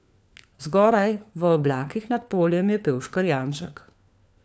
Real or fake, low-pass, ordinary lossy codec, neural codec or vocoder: fake; none; none; codec, 16 kHz, 4 kbps, FunCodec, trained on LibriTTS, 50 frames a second